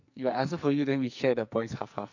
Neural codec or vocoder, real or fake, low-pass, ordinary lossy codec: codec, 44.1 kHz, 2.6 kbps, SNAC; fake; 7.2 kHz; none